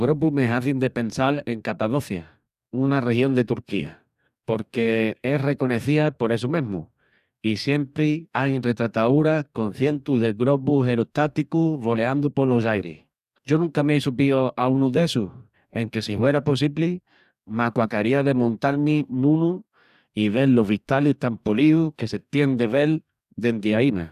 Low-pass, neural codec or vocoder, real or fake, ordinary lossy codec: 14.4 kHz; codec, 44.1 kHz, 2.6 kbps, DAC; fake; none